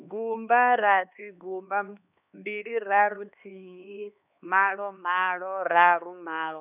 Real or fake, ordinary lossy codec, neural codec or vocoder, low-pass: fake; none; codec, 16 kHz, 2 kbps, X-Codec, HuBERT features, trained on LibriSpeech; 3.6 kHz